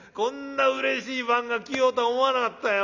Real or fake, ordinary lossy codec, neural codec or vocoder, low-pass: real; none; none; 7.2 kHz